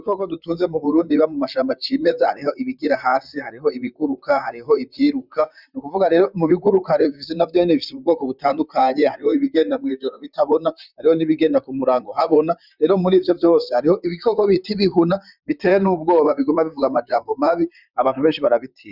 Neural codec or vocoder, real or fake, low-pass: vocoder, 44.1 kHz, 128 mel bands, Pupu-Vocoder; fake; 5.4 kHz